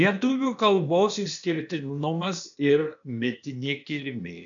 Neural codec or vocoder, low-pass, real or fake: codec, 16 kHz, 0.8 kbps, ZipCodec; 7.2 kHz; fake